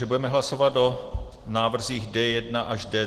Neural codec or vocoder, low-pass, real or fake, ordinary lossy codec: none; 14.4 kHz; real; Opus, 16 kbps